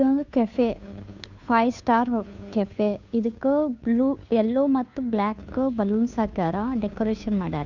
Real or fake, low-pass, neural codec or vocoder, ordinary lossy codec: fake; 7.2 kHz; codec, 16 kHz, 2 kbps, FunCodec, trained on Chinese and English, 25 frames a second; none